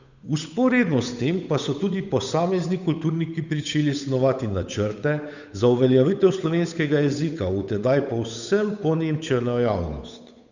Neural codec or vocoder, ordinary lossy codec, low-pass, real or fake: codec, 16 kHz, 8 kbps, FunCodec, trained on Chinese and English, 25 frames a second; none; 7.2 kHz; fake